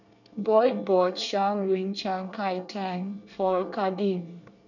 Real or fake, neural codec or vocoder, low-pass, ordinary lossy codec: fake; codec, 24 kHz, 1 kbps, SNAC; 7.2 kHz; none